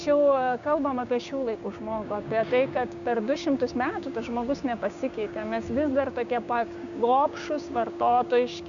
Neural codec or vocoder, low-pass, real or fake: codec, 16 kHz, 6 kbps, DAC; 7.2 kHz; fake